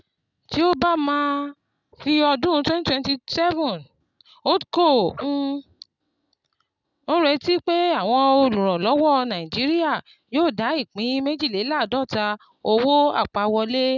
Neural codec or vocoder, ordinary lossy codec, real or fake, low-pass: none; none; real; 7.2 kHz